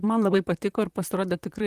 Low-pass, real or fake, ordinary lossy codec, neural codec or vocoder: 14.4 kHz; fake; Opus, 24 kbps; vocoder, 44.1 kHz, 128 mel bands, Pupu-Vocoder